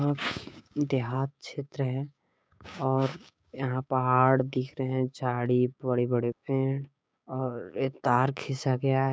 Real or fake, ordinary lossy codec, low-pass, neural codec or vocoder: fake; none; none; codec, 16 kHz, 6 kbps, DAC